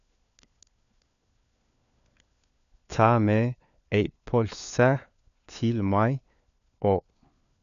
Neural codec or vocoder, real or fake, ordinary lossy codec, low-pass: codec, 16 kHz, 4 kbps, FunCodec, trained on LibriTTS, 50 frames a second; fake; none; 7.2 kHz